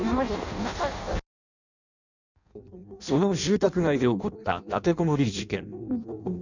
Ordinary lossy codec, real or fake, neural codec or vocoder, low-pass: none; fake; codec, 16 kHz in and 24 kHz out, 0.6 kbps, FireRedTTS-2 codec; 7.2 kHz